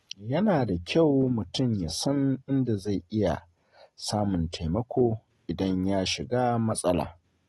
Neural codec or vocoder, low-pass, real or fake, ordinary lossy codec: none; 19.8 kHz; real; AAC, 32 kbps